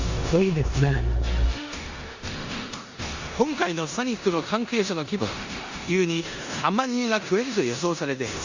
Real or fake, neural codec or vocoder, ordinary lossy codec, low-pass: fake; codec, 16 kHz in and 24 kHz out, 0.9 kbps, LongCat-Audio-Codec, four codebook decoder; Opus, 64 kbps; 7.2 kHz